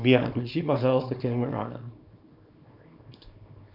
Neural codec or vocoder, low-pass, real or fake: codec, 24 kHz, 0.9 kbps, WavTokenizer, small release; 5.4 kHz; fake